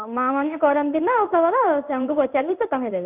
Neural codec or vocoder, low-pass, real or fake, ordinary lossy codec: codec, 16 kHz in and 24 kHz out, 1 kbps, XY-Tokenizer; 3.6 kHz; fake; none